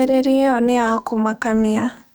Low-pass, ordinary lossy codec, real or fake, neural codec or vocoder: none; none; fake; codec, 44.1 kHz, 2.6 kbps, SNAC